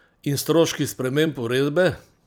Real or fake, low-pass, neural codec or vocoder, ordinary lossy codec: fake; none; vocoder, 44.1 kHz, 128 mel bands every 256 samples, BigVGAN v2; none